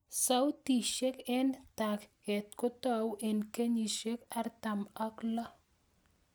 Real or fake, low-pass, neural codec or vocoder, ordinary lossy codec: real; none; none; none